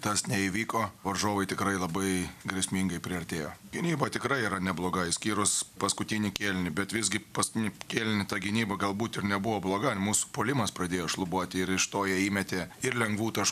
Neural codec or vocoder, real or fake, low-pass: none; real; 14.4 kHz